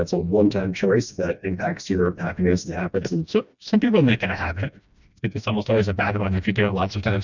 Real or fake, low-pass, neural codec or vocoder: fake; 7.2 kHz; codec, 16 kHz, 1 kbps, FreqCodec, smaller model